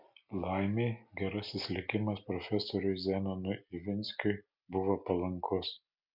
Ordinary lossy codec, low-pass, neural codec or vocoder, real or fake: MP3, 48 kbps; 5.4 kHz; none; real